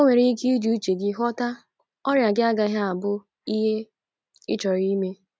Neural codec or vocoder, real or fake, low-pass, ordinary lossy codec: none; real; none; none